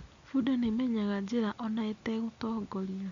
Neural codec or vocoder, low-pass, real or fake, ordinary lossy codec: none; 7.2 kHz; real; Opus, 64 kbps